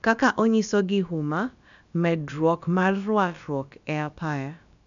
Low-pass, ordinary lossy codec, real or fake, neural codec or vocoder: 7.2 kHz; none; fake; codec, 16 kHz, about 1 kbps, DyCAST, with the encoder's durations